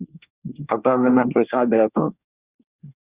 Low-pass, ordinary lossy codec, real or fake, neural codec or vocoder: 3.6 kHz; Opus, 64 kbps; fake; codec, 24 kHz, 1 kbps, SNAC